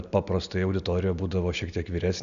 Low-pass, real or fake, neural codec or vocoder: 7.2 kHz; real; none